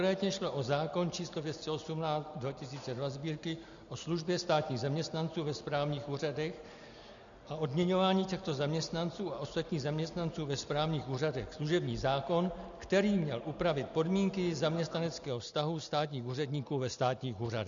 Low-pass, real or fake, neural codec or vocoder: 7.2 kHz; real; none